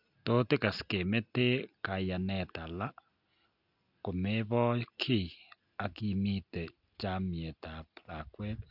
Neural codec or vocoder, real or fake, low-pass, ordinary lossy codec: none; real; 5.4 kHz; none